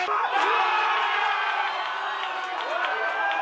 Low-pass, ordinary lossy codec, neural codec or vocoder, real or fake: none; none; none; real